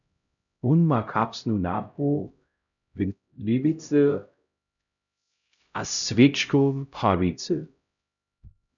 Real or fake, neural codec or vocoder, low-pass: fake; codec, 16 kHz, 0.5 kbps, X-Codec, HuBERT features, trained on LibriSpeech; 7.2 kHz